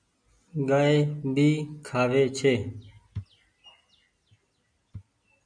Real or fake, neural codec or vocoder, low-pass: real; none; 9.9 kHz